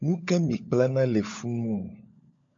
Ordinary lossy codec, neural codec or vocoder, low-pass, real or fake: MP3, 48 kbps; codec, 16 kHz, 16 kbps, FunCodec, trained on LibriTTS, 50 frames a second; 7.2 kHz; fake